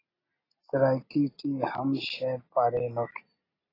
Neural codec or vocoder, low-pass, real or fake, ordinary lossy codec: none; 5.4 kHz; real; AAC, 24 kbps